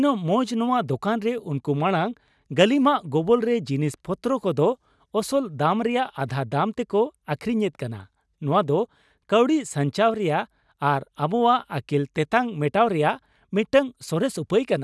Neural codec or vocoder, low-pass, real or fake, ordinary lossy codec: none; none; real; none